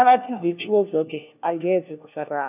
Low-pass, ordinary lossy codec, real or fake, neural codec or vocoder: 3.6 kHz; none; fake; codec, 16 kHz, 1 kbps, FunCodec, trained on LibriTTS, 50 frames a second